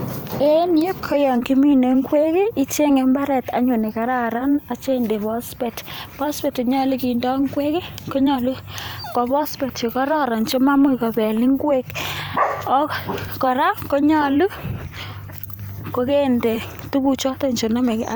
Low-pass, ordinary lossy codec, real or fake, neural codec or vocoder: none; none; fake; vocoder, 44.1 kHz, 128 mel bands every 512 samples, BigVGAN v2